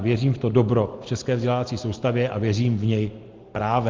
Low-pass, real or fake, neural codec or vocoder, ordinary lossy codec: 7.2 kHz; real; none; Opus, 16 kbps